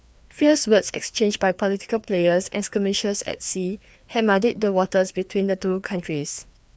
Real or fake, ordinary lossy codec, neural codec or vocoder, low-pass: fake; none; codec, 16 kHz, 2 kbps, FreqCodec, larger model; none